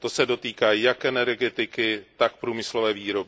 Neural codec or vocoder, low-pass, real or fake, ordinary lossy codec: none; none; real; none